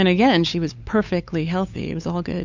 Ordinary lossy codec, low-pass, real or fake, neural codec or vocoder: Opus, 64 kbps; 7.2 kHz; fake; codec, 16 kHz, 8 kbps, FunCodec, trained on LibriTTS, 25 frames a second